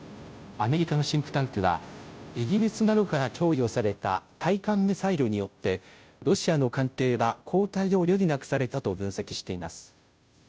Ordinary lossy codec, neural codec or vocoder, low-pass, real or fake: none; codec, 16 kHz, 0.5 kbps, FunCodec, trained on Chinese and English, 25 frames a second; none; fake